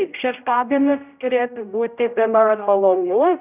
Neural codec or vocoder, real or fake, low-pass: codec, 16 kHz, 0.5 kbps, X-Codec, HuBERT features, trained on general audio; fake; 3.6 kHz